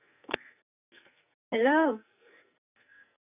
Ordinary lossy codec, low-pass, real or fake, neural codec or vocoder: none; 3.6 kHz; fake; codec, 44.1 kHz, 2.6 kbps, SNAC